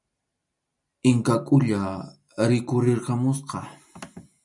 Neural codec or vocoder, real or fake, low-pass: none; real; 10.8 kHz